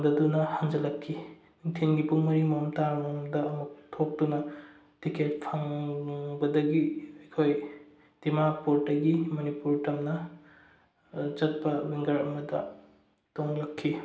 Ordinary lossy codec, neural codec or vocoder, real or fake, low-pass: none; none; real; none